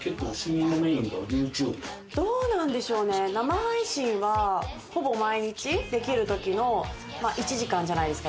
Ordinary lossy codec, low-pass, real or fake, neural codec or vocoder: none; none; real; none